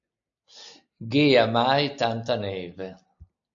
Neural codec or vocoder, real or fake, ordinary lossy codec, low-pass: none; real; AAC, 64 kbps; 7.2 kHz